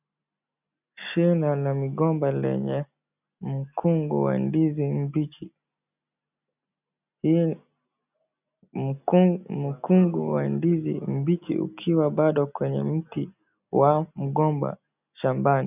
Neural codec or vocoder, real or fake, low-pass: none; real; 3.6 kHz